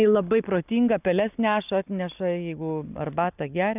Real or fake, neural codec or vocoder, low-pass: real; none; 3.6 kHz